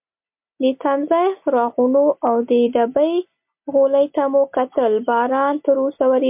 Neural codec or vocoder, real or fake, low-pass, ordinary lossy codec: none; real; 3.6 kHz; MP3, 32 kbps